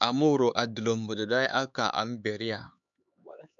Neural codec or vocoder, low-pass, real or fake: codec, 16 kHz, 4 kbps, X-Codec, HuBERT features, trained on LibriSpeech; 7.2 kHz; fake